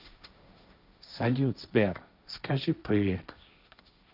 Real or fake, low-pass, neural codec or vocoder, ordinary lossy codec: fake; 5.4 kHz; codec, 16 kHz, 1.1 kbps, Voila-Tokenizer; none